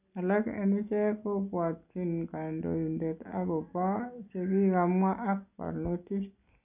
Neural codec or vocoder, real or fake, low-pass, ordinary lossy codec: none; real; 3.6 kHz; none